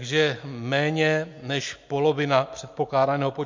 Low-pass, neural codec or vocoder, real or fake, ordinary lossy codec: 7.2 kHz; none; real; MP3, 48 kbps